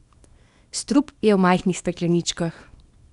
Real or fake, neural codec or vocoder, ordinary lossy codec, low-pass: fake; codec, 24 kHz, 0.9 kbps, WavTokenizer, small release; none; 10.8 kHz